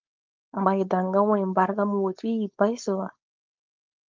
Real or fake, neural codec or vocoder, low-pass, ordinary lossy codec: fake; codec, 16 kHz, 4.8 kbps, FACodec; 7.2 kHz; Opus, 32 kbps